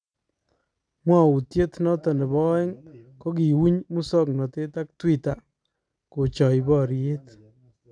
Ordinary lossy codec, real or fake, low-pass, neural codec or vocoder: none; real; 9.9 kHz; none